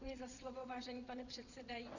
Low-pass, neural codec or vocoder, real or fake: 7.2 kHz; vocoder, 22.05 kHz, 80 mel bands, WaveNeXt; fake